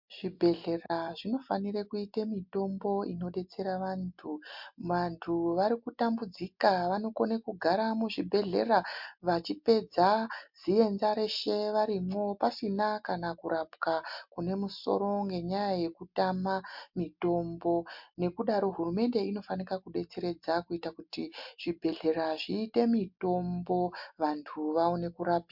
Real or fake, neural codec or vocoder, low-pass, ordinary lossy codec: real; none; 5.4 kHz; MP3, 48 kbps